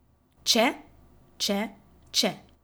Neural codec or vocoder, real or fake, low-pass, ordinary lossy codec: none; real; none; none